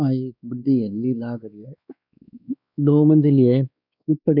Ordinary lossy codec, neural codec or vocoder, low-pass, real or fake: none; codec, 16 kHz, 2 kbps, X-Codec, WavLM features, trained on Multilingual LibriSpeech; 5.4 kHz; fake